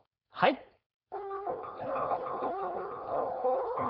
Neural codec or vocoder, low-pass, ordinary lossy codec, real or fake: codec, 16 kHz, 4.8 kbps, FACodec; 5.4 kHz; none; fake